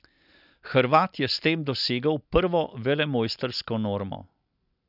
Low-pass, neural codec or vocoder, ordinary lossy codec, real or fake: 5.4 kHz; none; none; real